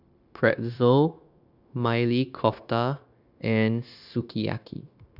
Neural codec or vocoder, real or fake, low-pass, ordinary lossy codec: codec, 16 kHz, 0.9 kbps, LongCat-Audio-Codec; fake; 5.4 kHz; none